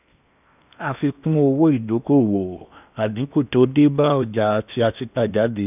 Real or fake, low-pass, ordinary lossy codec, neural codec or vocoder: fake; 3.6 kHz; none; codec, 16 kHz in and 24 kHz out, 0.8 kbps, FocalCodec, streaming, 65536 codes